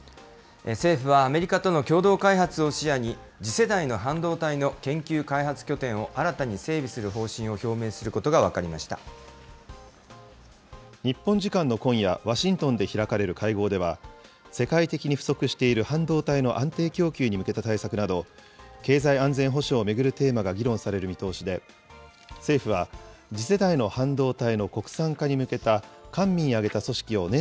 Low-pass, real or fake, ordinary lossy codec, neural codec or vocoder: none; real; none; none